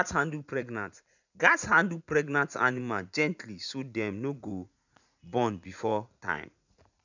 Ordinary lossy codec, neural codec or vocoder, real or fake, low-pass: none; vocoder, 44.1 kHz, 80 mel bands, Vocos; fake; 7.2 kHz